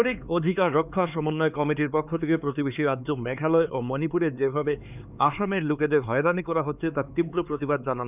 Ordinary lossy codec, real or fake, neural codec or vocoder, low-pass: none; fake; codec, 16 kHz, 4 kbps, X-Codec, HuBERT features, trained on LibriSpeech; 3.6 kHz